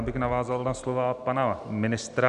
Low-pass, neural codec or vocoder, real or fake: 10.8 kHz; none; real